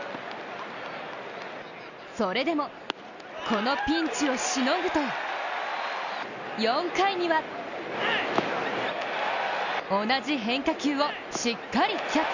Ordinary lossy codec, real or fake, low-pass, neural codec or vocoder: none; real; 7.2 kHz; none